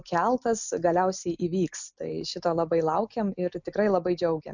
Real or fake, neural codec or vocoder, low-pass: real; none; 7.2 kHz